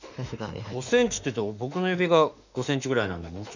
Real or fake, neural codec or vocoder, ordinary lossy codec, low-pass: fake; autoencoder, 48 kHz, 32 numbers a frame, DAC-VAE, trained on Japanese speech; none; 7.2 kHz